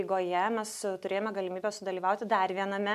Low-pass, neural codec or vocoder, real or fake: 14.4 kHz; none; real